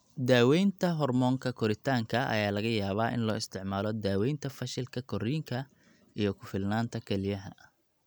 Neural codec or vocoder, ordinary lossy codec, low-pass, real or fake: none; none; none; real